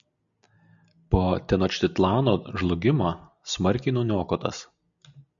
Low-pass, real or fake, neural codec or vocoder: 7.2 kHz; real; none